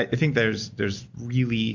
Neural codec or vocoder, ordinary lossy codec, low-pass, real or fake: none; MP3, 48 kbps; 7.2 kHz; real